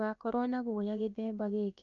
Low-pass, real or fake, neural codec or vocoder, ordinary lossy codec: 7.2 kHz; fake; codec, 16 kHz, about 1 kbps, DyCAST, with the encoder's durations; MP3, 96 kbps